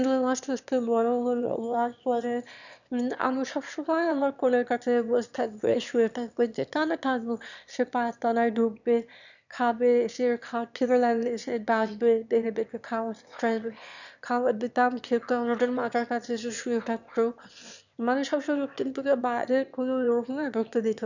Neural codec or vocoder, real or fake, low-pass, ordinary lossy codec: autoencoder, 22.05 kHz, a latent of 192 numbers a frame, VITS, trained on one speaker; fake; 7.2 kHz; none